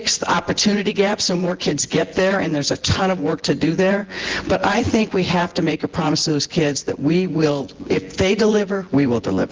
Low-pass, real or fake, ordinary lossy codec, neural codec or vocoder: 7.2 kHz; fake; Opus, 16 kbps; vocoder, 24 kHz, 100 mel bands, Vocos